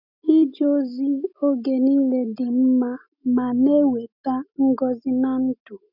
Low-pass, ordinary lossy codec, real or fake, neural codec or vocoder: 5.4 kHz; none; real; none